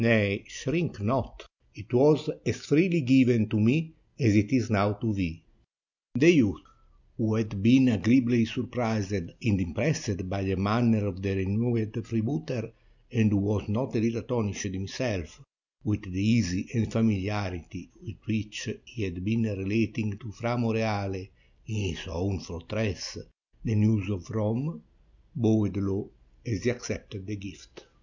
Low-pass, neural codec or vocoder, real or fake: 7.2 kHz; none; real